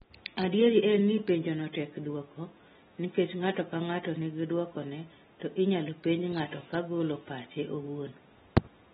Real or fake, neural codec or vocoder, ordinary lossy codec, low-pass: real; none; AAC, 16 kbps; 19.8 kHz